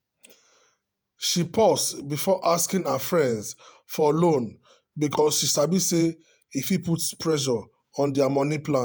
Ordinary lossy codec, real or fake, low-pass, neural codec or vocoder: none; fake; none; vocoder, 48 kHz, 128 mel bands, Vocos